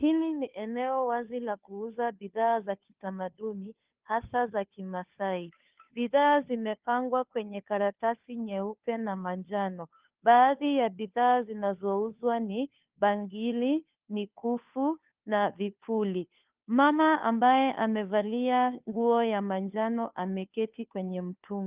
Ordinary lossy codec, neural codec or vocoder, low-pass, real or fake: Opus, 32 kbps; codec, 16 kHz, 2 kbps, FunCodec, trained on Chinese and English, 25 frames a second; 3.6 kHz; fake